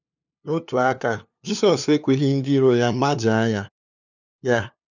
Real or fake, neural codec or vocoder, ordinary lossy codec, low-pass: fake; codec, 16 kHz, 2 kbps, FunCodec, trained on LibriTTS, 25 frames a second; none; 7.2 kHz